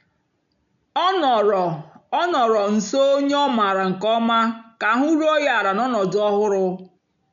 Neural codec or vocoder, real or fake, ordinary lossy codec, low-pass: none; real; none; 7.2 kHz